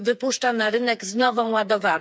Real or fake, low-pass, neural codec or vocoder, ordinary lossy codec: fake; none; codec, 16 kHz, 4 kbps, FreqCodec, smaller model; none